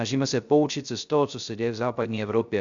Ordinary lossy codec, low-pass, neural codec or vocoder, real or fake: Opus, 64 kbps; 7.2 kHz; codec, 16 kHz, 0.3 kbps, FocalCodec; fake